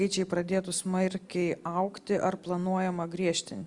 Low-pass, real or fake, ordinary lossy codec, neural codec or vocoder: 10.8 kHz; real; Opus, 64 kbps; none